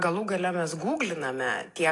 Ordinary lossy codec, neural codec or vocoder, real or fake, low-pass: AAC, 48 kbps; none; real; 10.8 kHz